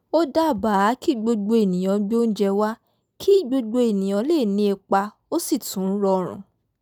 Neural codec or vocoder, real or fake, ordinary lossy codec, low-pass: none; real; none; 19.8 kHz